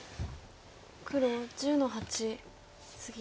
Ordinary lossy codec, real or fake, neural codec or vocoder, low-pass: none; real; none; none